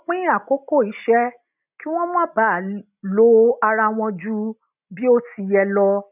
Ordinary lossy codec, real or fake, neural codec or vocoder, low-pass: none; real; none; 3.6 kHz